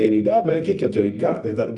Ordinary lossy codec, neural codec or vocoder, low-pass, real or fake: Opus, 64 kbps; codec, 24 kHz, 0.9 kbps, WavTokenizer, medium music audio release; 10.8 kHz; fake